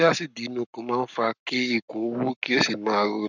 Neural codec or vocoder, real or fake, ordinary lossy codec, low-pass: codec, 44.1 kHz, 7.8 kbps, Pupu-Codec; fake; none; 7.2 kHz